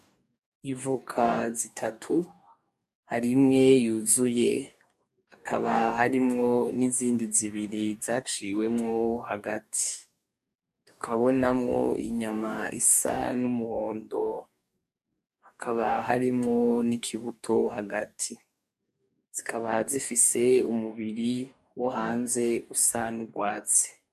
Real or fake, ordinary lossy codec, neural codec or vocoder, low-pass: fake; MP3, 96 kbps; codec, 44.1 kHz, 2.6 kbps, DAC; 14.4 kHz